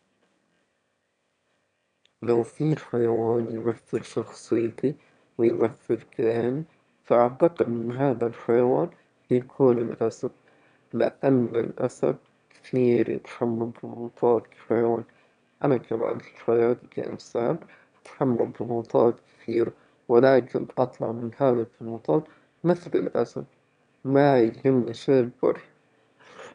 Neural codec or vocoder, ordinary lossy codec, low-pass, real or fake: autoencoder, 22.05 kHz, a latent of 192 numbers a frame, VITS, trained on one speaker; none; 9.9 kHz; fake